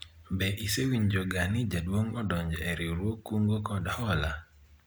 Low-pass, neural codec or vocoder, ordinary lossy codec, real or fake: none; none; none; real